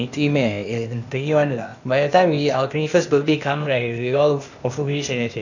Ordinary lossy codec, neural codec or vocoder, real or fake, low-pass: none; codec, 16 kHz, 0.8 kbps, ZipCodec; fake; 7.2 kHz